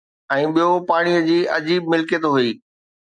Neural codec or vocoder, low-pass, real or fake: none; 9.9 kHz; real